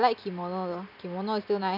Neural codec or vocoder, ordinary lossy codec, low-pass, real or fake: none; none; 5.4 kHz; real